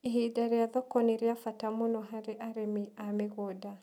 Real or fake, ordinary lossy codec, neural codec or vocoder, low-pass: real; none; none; 19.8 kHz